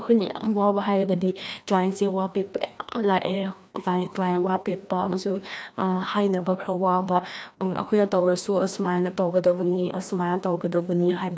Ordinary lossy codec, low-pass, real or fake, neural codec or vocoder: none; none; fake; codec, 16 kHz, 1 kbps, FreqCodec, larger model